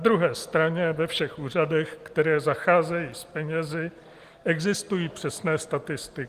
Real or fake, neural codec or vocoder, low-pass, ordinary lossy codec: real; none; 14.4 kHz; Opus, 24 kbps